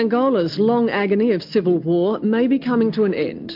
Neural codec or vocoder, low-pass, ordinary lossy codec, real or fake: none; 5.4 kHz; MP3, 48 kbps; real